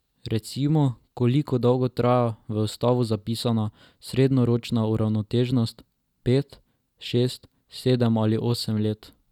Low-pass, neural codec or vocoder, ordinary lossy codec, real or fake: 19.8 kHz; none; none; real